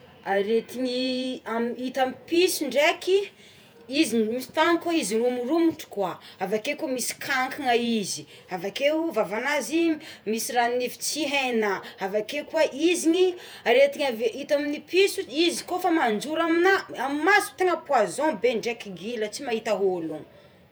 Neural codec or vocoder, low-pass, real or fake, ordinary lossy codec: vocoder, 48 kHz, 128 mel bands, Vocos; none; fake; none